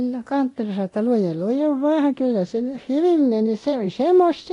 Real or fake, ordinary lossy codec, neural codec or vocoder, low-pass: fake; AAC, 48 kbps; codec, 24 kHz, 0.9 kbps, DualCodec; 10.8 kHz